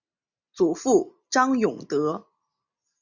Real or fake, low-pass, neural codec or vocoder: real; 7.2 kHz; none